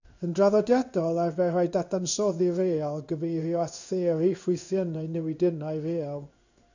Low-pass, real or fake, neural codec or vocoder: 7.2 kHz; fake; codec, 16 kHz in and 24 kHz out, 1 kbps, XY-Tokenizer